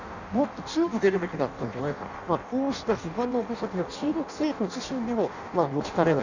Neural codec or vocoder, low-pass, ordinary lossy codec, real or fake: codec, 16 kHz in and 24 kHz out, 0.6 kbps, FireRedTTS-2 codec; 7.2 kHz; none; fake